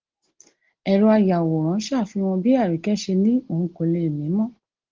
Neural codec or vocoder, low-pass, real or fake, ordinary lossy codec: none; 7.2 kHz; real; Opus, 16 kbps